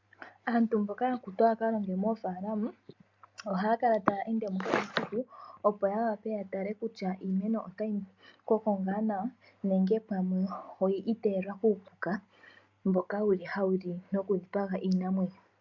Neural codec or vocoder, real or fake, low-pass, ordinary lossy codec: none; real; 7.2 kHz; MP3, 64 kbps